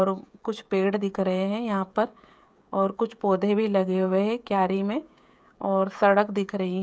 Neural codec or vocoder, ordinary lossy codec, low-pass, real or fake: codec, 16 kHz, 16 kbps, FreqCodec, smaller model; none; none; fake